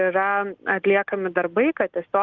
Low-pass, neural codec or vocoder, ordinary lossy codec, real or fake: 7.2 kHz; none; Opus, 24 kbps; real